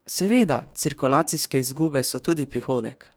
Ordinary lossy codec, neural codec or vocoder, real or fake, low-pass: none; codec, 44.1 kHz, 2.6 kbps, DAC; fake; none